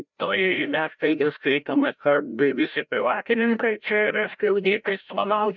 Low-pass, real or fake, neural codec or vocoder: 7.2 kHz; fake; codec, 16 kHz, 0.5 kbps, FreqCodec, larger model